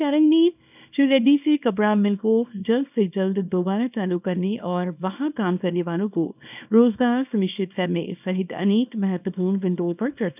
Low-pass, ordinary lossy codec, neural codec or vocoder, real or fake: 3.6 kHz; none; codec, 24 kHz, 0.9 kbps, WavTokenizer, small release; fake